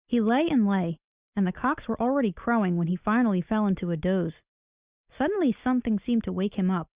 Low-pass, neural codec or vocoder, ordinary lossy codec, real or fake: 3.6 kHz; none; Opus, 64 kbps; real